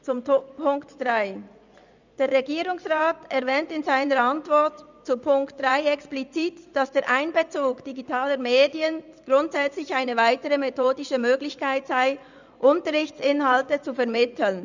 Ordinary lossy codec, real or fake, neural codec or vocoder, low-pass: none; fake; vocoder, 24 kHz, 100 mel bands, Vocos; 7.2 kHz